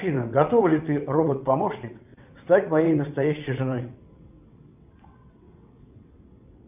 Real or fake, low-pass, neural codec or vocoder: fake; 3.6 kHz; vocoder, 22.05 kHz, 80 mel bands, WaveNeXt